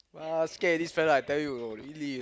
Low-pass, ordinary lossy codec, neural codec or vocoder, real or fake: none; none; none; real